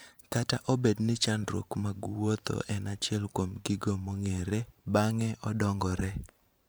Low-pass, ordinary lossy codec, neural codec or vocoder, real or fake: none; none; vocoder, 44.1 kHz, 128 mel bands every 512 samples, BigVGAN v2; fake